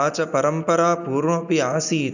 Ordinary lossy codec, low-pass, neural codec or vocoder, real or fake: none; 7.2 kHz; none; real